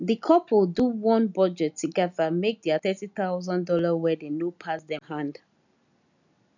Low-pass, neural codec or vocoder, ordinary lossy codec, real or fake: 7.2 kHz; none; none; real